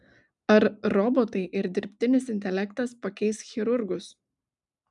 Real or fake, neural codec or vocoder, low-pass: real; none; 10.8 kHz